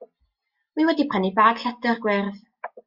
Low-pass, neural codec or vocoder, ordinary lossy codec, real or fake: 5.4 kHz; none; Opus, 64 kbps; real